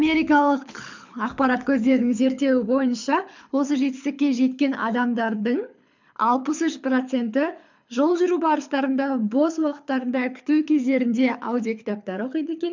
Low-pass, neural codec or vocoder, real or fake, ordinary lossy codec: 7.2 kHz; codec, 24 kHz, 6 kbps, HILCodec; fake; MP3, 64 kbps